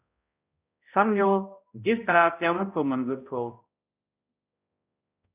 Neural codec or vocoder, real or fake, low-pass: codec, 16 kHz, 0.5 kbps, X-Codec, HuBERT features, trained on balanced general audio; fake; 3.6 kHz